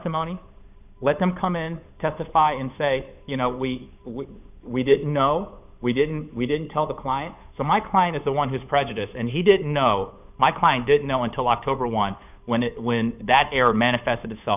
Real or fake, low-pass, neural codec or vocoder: fake; 3.6 kHz; codec, 16 kHz, 8 kbps, FunCodec, trained on Chinese and English, 25 frames a second